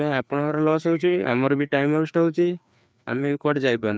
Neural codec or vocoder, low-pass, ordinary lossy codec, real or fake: codec, 16 kHz, 2 kbps, FreqCodec, larger model; none; none; fake